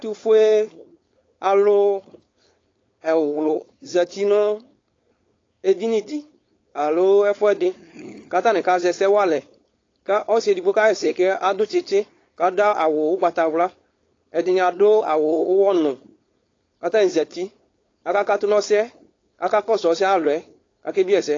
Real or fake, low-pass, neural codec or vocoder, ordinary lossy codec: fake; 7.2 kHz; codec, 16 kHz, 4.8 kbps, FACodec; AAC, 32 kbps